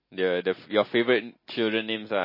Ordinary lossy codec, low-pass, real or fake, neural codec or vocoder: MP3, 24 kbps; 5.4 kHz; real; none